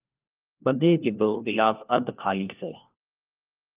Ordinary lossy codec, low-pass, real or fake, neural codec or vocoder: Opus, 32 kbps; 3.6 kHz; fake; codec, 16 kHz, 1 kbps, FunCodec, trained on LibriTTS, 50 frames a second